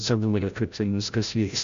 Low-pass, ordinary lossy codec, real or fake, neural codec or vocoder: 7.2 kHz; MP3, 64 kbps; fake; codec, 16 kHz, 0.5 kbps, FreqCodec, larger model